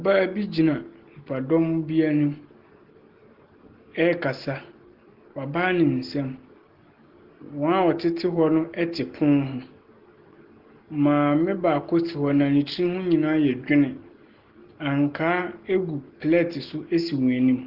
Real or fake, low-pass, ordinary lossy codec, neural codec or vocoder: real; 5.4 kHz; Opus, 16 kbps; none